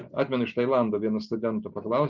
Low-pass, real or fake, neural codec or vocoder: 7.2 kHz; real; none